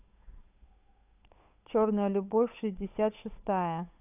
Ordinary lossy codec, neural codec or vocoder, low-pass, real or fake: none; codec, 16 kHz, 8 kbps, FunCodec, trained on Chinese and English, 25 frames a second; 3.6 kHz; fake